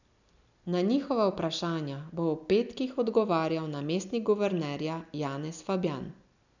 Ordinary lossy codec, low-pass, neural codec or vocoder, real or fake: none; 7.2 kHz; none; real